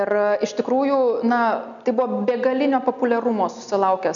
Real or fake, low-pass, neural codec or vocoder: real; 7.2 kHz; none